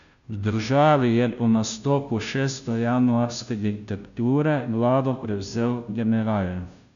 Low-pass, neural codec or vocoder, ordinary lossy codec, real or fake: 7.2 kHz; codec, 16 kHz, 0.5 kbps, FunCodec, trained on Chinese and English, 25 frames a second; none; fake